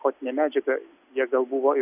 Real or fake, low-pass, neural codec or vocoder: real; 3.6 kHz; none